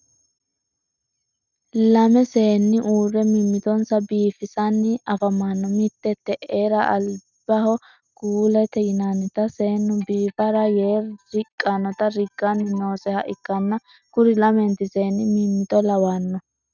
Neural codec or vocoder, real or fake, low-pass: none; real; 7.2 kHz